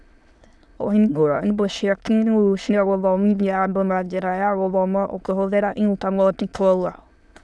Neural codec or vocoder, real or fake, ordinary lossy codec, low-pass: autoencoder, 22.05 kHz, a latent of 192 numbers a frame, VITS, trained on many speakers; fake; none; none